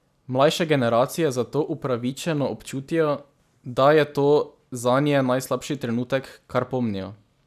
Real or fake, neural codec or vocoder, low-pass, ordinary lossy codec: real; none; 14.4 kHz; none